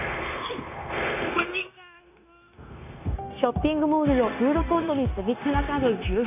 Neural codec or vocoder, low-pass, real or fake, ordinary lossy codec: codec, 16 kHz, 0.9 kbps, LongCat-Audio-Codec; 3.6 kHz; fake; none